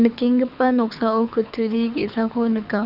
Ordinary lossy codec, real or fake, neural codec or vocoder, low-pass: none; fake; codec, 24 kHz, 6 kbps, HILCodec; 5.4 kHz